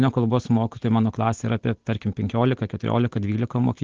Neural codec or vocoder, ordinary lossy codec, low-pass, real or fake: none; Opus, 16 kbps; 7.2 kHz; real